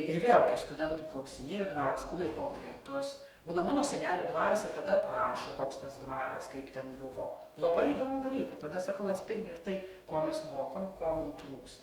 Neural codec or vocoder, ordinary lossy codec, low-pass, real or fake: codec, 44.1 kHz, 2.6 kbps, DAC; Opus, 64 kbps; 19.8 kHz; fake